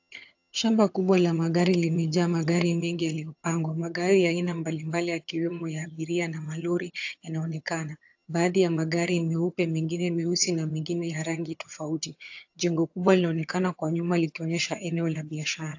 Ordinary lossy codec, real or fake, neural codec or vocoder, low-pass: AAC, 48 kbps; fake; vocoder, 22.05 kHz, 80 mel bands, HiFi-GAN; 7.2 kHz